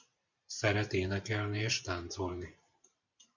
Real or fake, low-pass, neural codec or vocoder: fake; 7.2 kHz; vocoder, 44.1 kHz, 128 mel bands every 512 samples, BigVGAN v2